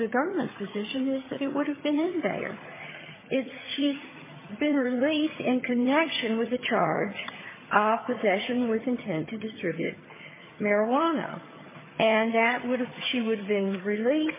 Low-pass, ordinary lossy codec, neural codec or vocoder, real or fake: 3.6 kHz; MP3, 16 kbps; vocoder, 22.05 kHz, 80 mel bands, HiFi-GAN; fake